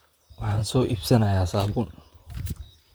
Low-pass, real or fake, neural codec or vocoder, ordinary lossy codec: none; fake; vocoder, 44.1 kHz, 128 mel bands, Pupu-Vocoder; none